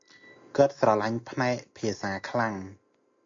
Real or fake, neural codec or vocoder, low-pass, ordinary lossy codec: real; none; 7.2 kHz; AAC, 48 kbps